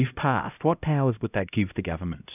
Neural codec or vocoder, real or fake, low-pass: codec, 16 kHz, 0.5 kbps, X-Codec, HuBERT features, trained on LibriSpeech; fake; 3.6 kHz